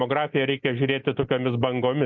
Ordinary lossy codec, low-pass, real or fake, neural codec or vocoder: MP3, 48 kbps; 7.2 kHz; real; none